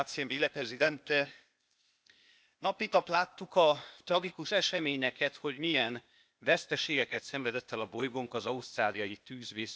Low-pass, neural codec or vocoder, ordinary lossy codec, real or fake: none; codec, 16 kHz, 0.8 kbps, ZipCodec; none; fake